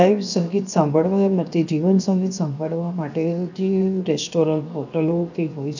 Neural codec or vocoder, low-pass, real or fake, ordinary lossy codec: codec, 16 kHz, 0.7 kbps, FocalCodec; 7.2 kHz; fake; none